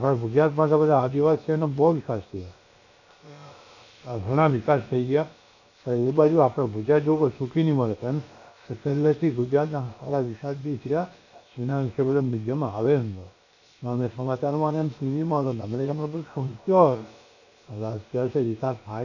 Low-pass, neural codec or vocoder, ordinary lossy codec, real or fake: 7.2 kHz; codec, 16 kHz, about 1 kbps, DyCAST, with the encoder's durations; none; fake